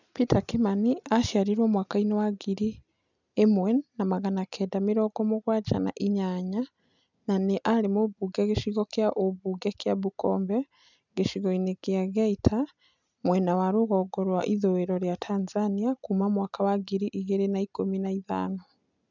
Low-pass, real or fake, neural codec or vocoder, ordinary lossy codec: 7.2 kHz; real; none; none